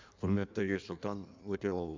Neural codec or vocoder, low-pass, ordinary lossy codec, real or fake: codec, 16 kHz in and 24 kHz out, 1.1 kbps, FireRedTTS-2 codec; 7.2 kHz; MP3, 64 kbps; fake